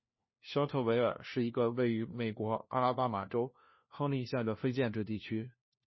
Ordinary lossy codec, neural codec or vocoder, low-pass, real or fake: MP3, 24 kbps; codec, 16 kHz, 1 kbps, FunCodec, trained on LibriTTS, 50 frames a second; 7.2 kHz; fake